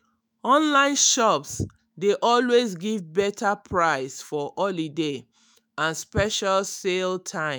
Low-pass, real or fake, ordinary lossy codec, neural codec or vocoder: none; fake; none; autoencoder, 48 kHz, 128 numbers a frame, DAC-VAE, trained on Japanese speech